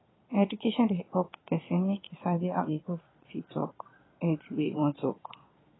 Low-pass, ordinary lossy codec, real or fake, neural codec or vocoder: 7.2 kHz; AAC, 16 kbps; fake; vocoder, 22.05 kHz, 80 mel bands, Vocos